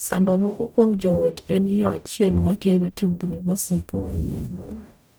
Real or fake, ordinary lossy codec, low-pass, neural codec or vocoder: fake; none; none; codec, 44.1 kHz, 0.9 kbps, DAC